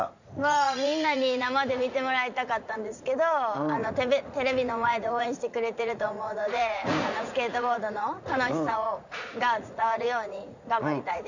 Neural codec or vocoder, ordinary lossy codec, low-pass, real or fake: vocoder, 44.1 kHz, 80 mel bands, Vocos; none; 7.2 kHz; fake